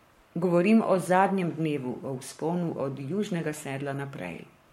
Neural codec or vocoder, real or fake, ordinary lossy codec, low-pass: codec, 44.1 kHz, 7.8 kbps, Pupu-Codec; fake; MP3, 64 kbps; 19.8 kHz